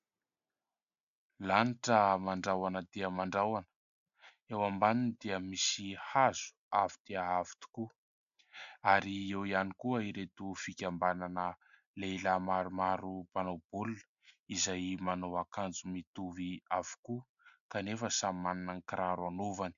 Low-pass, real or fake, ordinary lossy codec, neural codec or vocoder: 7.2 kHz; real; AAC, 96 kbps; none